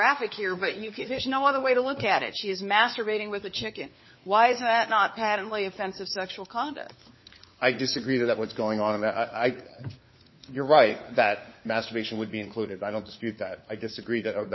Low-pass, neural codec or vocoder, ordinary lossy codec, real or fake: 7.2 kHz; codec, 16 kHz, 4 kbps, FunCodec, trained on LibriTTS, 50 frames a second; MP3, 24 kbps; fake